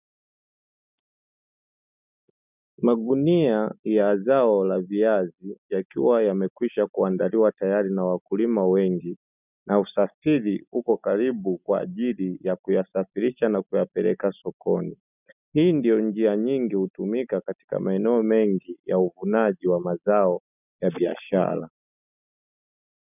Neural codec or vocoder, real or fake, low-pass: none; real; 3.6 kHz